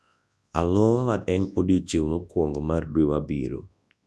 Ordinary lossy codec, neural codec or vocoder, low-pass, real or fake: none; codec, 24 kHz, 0.9 kbps, WavTokenizer, large speech release; none; fake